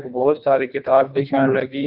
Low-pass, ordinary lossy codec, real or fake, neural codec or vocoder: 5.4 kHz; none; fake; codec, 24 kHz, 1.5 kbps, HILCodec